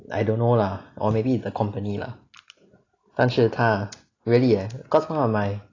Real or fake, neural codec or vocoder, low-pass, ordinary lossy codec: real; none; 7.2 kHz; AAC, 32 kbps